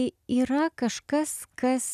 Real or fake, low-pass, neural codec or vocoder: real; 14.4 kHz; none